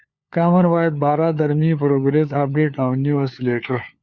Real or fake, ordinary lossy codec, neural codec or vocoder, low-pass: fake; Opus, 64 kbps; codec, 16 kHz, 4 kbps, FunCodec, trained on LibriTTS, 50 frames a second; 7.2 kHz